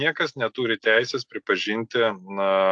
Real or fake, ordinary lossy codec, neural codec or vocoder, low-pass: real; AAC, 64 kbps; none; 9.9 kHz